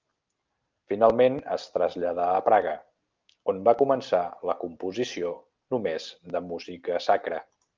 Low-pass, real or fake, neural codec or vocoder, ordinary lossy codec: 7.2 kHz; real; none; Opus, 24 kbps